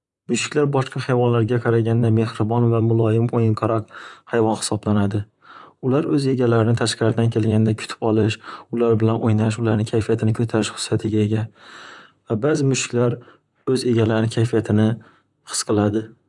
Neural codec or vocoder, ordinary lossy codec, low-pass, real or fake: vocoder, 44.1 kHz, 128 mel bands, Pupu-Vocoder; none; 10.8 kHz; fake